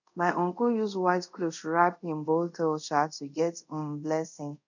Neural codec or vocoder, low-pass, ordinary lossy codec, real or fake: codec, 24 kHz, 0.5 kbps, DualCodec; 7.2 kHz; none; fake